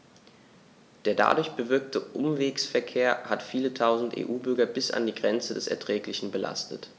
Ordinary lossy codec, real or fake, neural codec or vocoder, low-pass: none; real; none; none